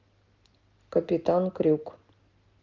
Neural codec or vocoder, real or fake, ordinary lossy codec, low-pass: none; real; Opus, 32 kbps; 7.2 kHz